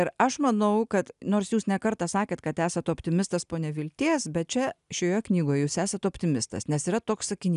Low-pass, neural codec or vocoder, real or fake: 10.8 kHz; none; real